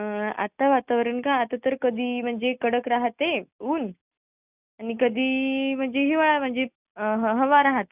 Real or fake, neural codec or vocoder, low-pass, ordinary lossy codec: real; none; 3.6 kHz; none